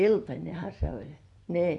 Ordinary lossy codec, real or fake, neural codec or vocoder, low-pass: none; fake; vocoder, 24 kHz, 100 mel bands, Vocos; 10.8 kHz